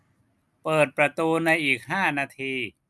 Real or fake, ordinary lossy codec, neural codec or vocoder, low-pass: real; none; none; none